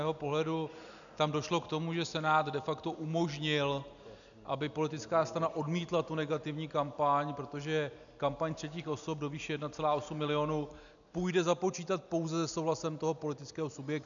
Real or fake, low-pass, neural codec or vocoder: real; 7.2 kHz; none